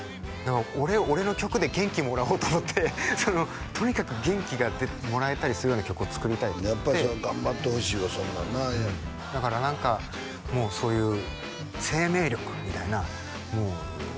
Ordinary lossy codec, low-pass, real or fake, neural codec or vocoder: none; none; real; none